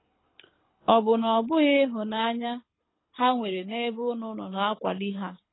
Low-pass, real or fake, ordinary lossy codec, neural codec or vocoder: 7.2 kHz; fake; AAC, 16 kbps; codec, 24 kHz, 6 kbps, HILCodec